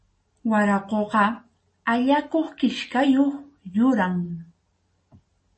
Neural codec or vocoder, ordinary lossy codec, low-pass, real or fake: none; MP3, 32 kbps; 10.8 kHz; real